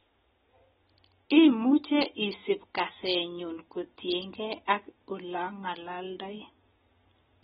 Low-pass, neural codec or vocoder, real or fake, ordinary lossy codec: 7.2 kHz; none; real; AAC, 16 kbps